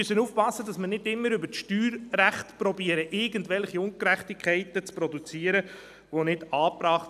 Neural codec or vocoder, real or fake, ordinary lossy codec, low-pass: none; real; none; 14.4 kHz